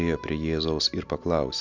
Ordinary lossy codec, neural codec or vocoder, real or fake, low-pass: MP3, 64 kbps; none; real; 7.2 kHz